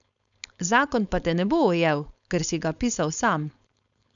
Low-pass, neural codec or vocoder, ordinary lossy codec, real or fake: 7.2 kHz; codec, 16 kHz, 4.8 kbps, FACodec; none; fake